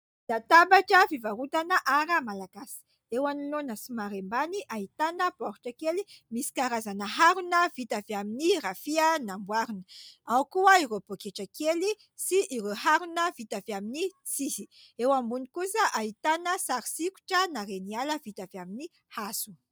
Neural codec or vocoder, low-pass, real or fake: none; 19.8 kHz; real